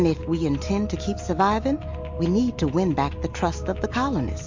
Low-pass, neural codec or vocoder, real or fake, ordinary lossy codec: 7.2 kHz; none; real; MP3, 48 kbps